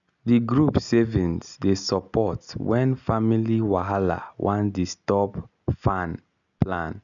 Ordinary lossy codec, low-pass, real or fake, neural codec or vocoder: none; 7.2 kHz; real; none